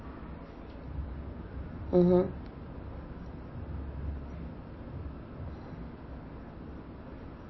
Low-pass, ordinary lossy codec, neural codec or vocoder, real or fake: 7.2 kHz; MP3, 24 kbps; none; real